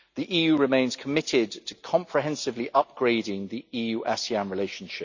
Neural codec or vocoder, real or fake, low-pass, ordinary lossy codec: none; real; 7.2 kHz; none